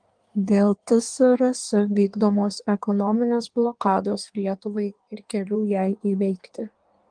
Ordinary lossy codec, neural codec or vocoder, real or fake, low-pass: Opus, 24 kbps; codec, 16 kHz in and 24 kHz out, 1.1 kbps, FireRedTTS-2 codec; fake; 9.9 kHz